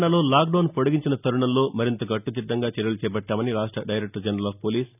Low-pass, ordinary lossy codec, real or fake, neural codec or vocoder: 3.6 kHz; none; real; none